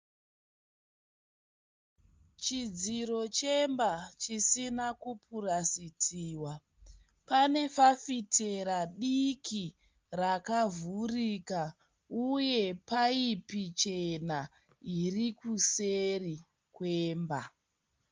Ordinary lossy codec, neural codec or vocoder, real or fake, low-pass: Opus, 32 kbps; none; real; 7.2 kHz